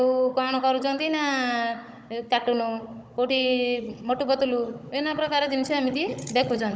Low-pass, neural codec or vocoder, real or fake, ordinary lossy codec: none; codec, 16 kHz, 16 kbps, FunCodec, trained on Chinese and English, 50 frames a second; fake; none